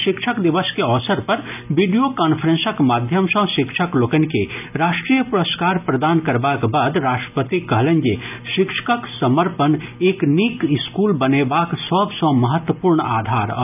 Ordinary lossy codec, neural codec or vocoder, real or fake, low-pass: none; none; real; 3.6 kHz